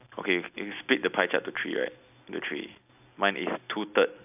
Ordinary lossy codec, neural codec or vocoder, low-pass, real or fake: none; none; 3.6 kHz; real